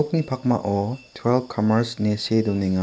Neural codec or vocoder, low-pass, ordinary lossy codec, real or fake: none; none; none; real